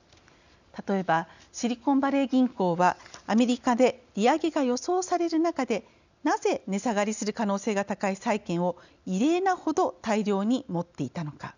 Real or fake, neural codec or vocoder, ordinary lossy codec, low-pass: real; none; none; 7.2 kHz